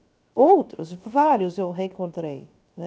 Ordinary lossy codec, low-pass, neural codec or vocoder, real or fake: none; none; codec, 16 kHz, 0.7 kbps, FocalCodec; fake